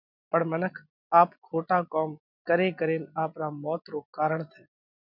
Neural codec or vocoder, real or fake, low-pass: none; real; 5.4 kHz